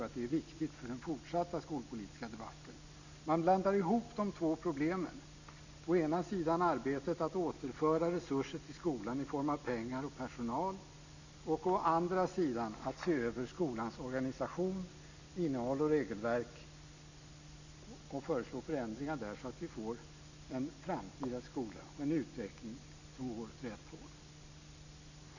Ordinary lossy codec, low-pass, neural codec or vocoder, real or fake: none; 7.2 kHz; none; real